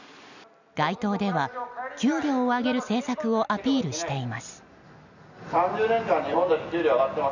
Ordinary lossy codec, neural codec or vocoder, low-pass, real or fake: none; none; 7.2 kHz; real